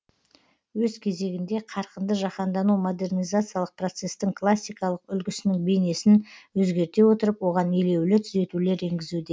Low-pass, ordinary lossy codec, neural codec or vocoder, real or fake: none; none; none; real